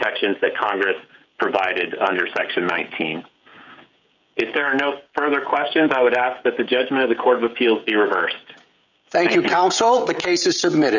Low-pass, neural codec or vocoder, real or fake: 7.2 kHz; codec, 16 kHz, 16 kbps, FreqCodec, smaller model; fake